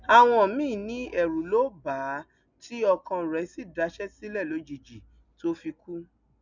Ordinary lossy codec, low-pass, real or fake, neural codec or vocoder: none; 7.2 kHz; real; none